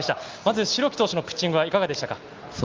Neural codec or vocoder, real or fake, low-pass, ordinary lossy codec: none; real; 7.2 kHz; Opus, 24 kbps